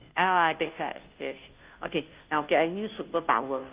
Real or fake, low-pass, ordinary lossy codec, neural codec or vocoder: fake; 3.6 kHz; Opus, 32 kbps; codec, 16 kHz, 0.5 kbps, FunCodec, trained on Chinese and English, 25 frames a second